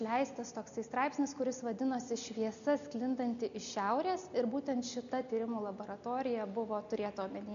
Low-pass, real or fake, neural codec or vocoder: 7.2 kHz; real; none